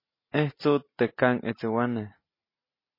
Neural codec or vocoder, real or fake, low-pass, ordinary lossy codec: none; real; 5.4 kHz; MP3, 24 kbps